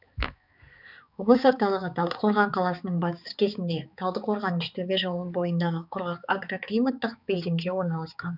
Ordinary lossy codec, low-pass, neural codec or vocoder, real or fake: none; 5.4 kHz; codec, 16 kHz, 4 kbps, X-Codec, HuBERT features, trained on balanced general audio; fake